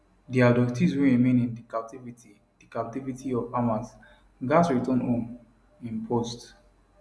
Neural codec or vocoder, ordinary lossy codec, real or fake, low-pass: none; none; real; none